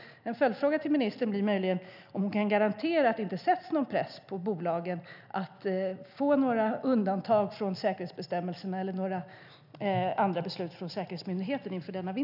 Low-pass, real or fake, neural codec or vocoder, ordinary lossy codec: 5.4 kHz; real; none; none